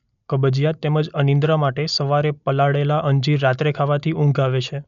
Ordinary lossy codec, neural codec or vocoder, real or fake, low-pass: none; none; real; 7.2 kHz